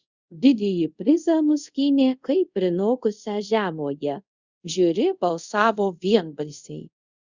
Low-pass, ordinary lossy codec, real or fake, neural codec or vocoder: 7.2 kHz; Opus, 64 kbps; fake; codec, 24 kHz, 0.5 kbps, DualCodec